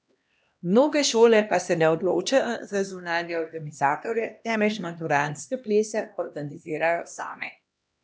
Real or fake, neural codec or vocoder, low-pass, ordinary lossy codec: fake; codec, 16 kHz, 1 kbps, X-Codec, HuBERT features, trained on LibriSpeech; none; none